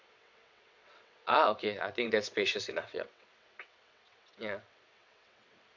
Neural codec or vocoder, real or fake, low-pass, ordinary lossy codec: vocoder, 22.05 kHz, 80 mel bands, WaveNeXt; fake; 7.2 kHz; MP3, 64 kbps